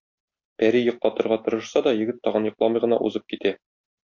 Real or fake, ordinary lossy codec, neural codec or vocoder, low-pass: real; MP3, 48 kbps; none; 7.2 kHz